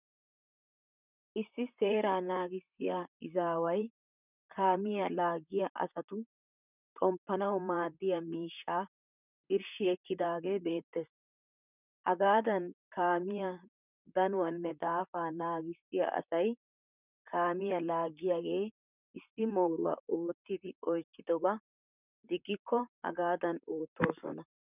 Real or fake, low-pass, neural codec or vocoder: fake; 3.6 kHz; vocoder, 44.1 kHz, 128 mel bands, Pupu-Vocoder